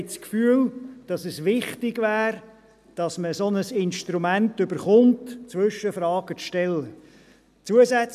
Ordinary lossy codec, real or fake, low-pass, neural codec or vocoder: none; real; 14.4 kHz; none